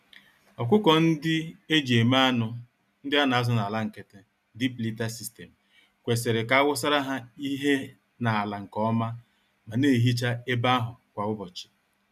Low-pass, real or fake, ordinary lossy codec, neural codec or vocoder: 14.4 kHz; real; none; none